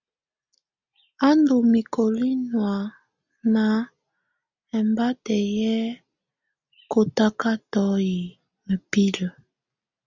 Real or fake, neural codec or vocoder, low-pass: real; none; 7.2 kHz